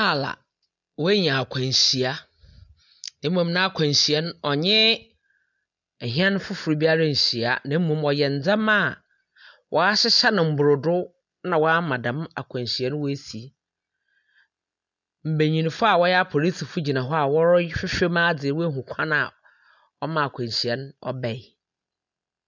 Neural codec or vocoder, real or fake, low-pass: none; real; 7.2 kHz